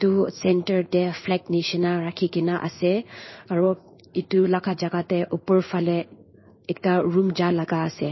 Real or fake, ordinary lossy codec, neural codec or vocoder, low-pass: fake; MP3, 24 kbps; codec, 16 kHz in and 24 kHz out, 1 kbps, XY-Tokenizer; 7.2 kHz